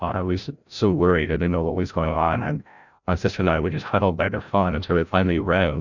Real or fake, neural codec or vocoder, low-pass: fake; codec, 16 kHz, 0.5 kbps, FreqCodec, larger model; 7.2 kHz